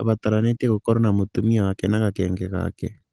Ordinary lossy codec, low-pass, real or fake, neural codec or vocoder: Opus, 16 kbps; 10.8 kHz; real; none